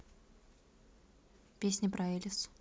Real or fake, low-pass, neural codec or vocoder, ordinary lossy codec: real; none; none; none